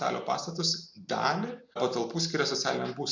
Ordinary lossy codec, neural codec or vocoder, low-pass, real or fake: MP3, 64 kbps; none; 7.2 kHz; real